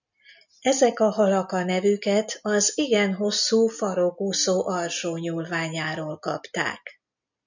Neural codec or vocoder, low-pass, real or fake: none; 7.2 kHz; real